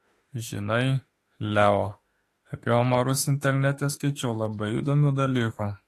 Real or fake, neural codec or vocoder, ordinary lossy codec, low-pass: fake; autoencoder, 48 kHz, 32 numbers a frame, DAC-VAE, trained on Japanese speech; AAC, 48 kbps; 14.4 kHz